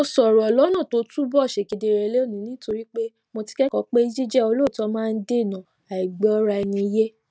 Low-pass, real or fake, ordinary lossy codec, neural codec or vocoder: none; real; none; none